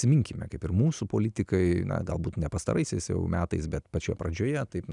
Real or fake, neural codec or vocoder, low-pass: real; none; 10.8 kHz